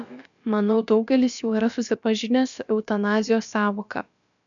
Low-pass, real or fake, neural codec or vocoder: 7.2 kHz; fake; codec, 16 kHz, about 1 kbps, DyCAST, with the encoder's durations